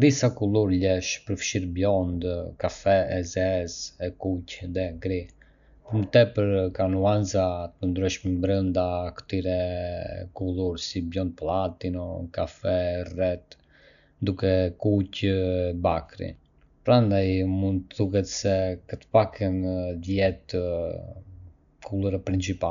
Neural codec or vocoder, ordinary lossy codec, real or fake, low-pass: none; none; real; 7.2 kHz